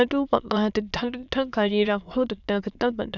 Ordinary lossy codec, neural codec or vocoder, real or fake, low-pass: none; autoencoder, 22.05 kHz, a latent of 192 numbers a frame, VITS, trained on many speakers; fake; 7.2 kHz